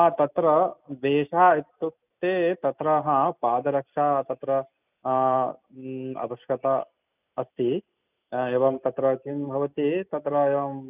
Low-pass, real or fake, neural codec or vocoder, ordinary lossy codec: 3.6 kHz; real; none; none